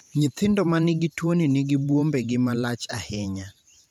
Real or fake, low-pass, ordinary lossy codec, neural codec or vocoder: fake; 19.8 kHz; none; vocoder, 44.1 kHz, 128 mel bands every 512 samples, BigVGAN v2